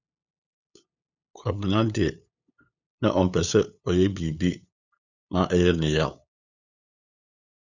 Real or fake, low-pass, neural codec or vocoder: fake; 7.2 kHz; codec, 16 kHz, 8 kbps, FunCodec, trained on LibriTTS, 25 frames a second